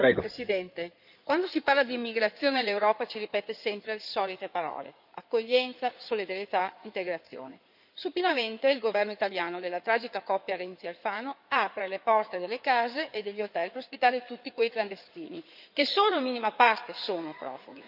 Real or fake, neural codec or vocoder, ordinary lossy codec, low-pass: fake; codec, 16 kHz in and 24 kHz out, 2.2 kbps, FireRedTTS-2 codec; none; 5.4 kHz